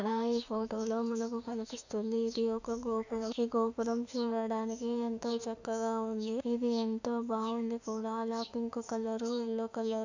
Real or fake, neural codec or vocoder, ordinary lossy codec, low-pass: fake; autoencoder, 48 kHz, 32 numbers a frame, DAC-VAE, trained on Japanese speech; none; 7.2 kHz